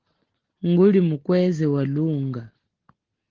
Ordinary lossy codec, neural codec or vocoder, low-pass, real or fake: Opus, 16 kbps; none; 7.2 kHz; real